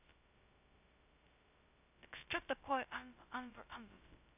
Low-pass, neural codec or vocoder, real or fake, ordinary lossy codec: 3.6 kHz; codec, 16 kHz, 0.2 kbps, FocalCodec; fake; none